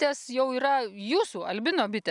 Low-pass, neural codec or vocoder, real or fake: 10.8 kHz; none; real